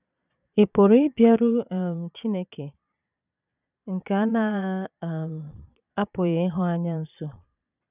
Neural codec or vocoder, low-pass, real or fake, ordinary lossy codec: vocoder, 22.05 kHz, 80 mel bands, Vocos; 3.6 kHz; fake; none